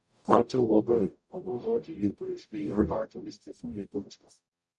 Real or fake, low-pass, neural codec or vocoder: fake; 10.8 kHz; codec, 44.1 kHz, 0.9 kbps, DAC